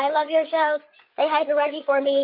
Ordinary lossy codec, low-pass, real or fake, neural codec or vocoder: MP3, 32 kbps; 5.4 kHz; fake; codec, 16 kHz, 8 kbps, FreqCodec, larger model